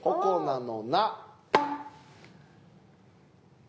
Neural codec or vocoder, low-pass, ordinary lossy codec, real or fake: none; none; none; real